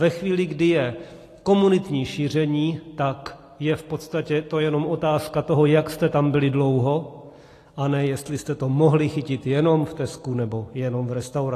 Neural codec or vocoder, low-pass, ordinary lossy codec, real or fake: none; 14.4 kHz; AAC, 48 kbps; real